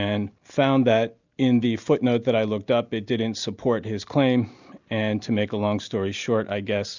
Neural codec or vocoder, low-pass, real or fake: none; 7.2 kHz; real